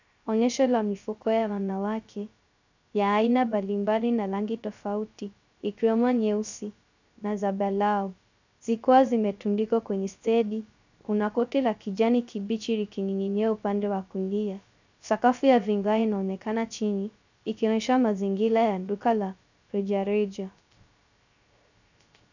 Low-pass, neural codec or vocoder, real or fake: 7.2 kHz; codec, 16 kHz, 0.3 kbps, FocalCodec; fake